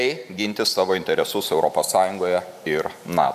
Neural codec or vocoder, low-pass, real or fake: vocoder, 44.1 kHz, 128 mel bands every 512 samples, BigVGAN v2; 14.4 kHz; fake